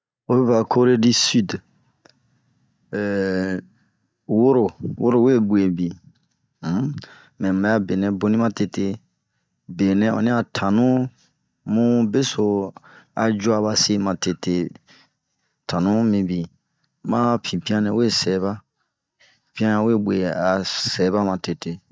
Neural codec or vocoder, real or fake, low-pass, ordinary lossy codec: none; real; none; none